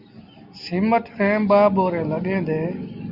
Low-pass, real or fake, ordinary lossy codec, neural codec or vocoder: 5.4 kHz; real; Opus, 64 kbps; none